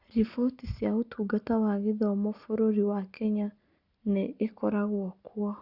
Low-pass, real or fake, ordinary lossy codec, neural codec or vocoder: 5.4 kHz; real; Opus, 64 kbps; none